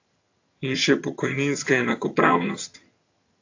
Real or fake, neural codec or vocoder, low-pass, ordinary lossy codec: fake; vocoder, 22.05 kHz, 80 mel bands, HiFi-GAN; 7.2 kHz; AAC, 48 kbps